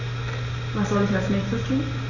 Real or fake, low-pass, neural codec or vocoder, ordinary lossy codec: real; 7.2 kHz; none; none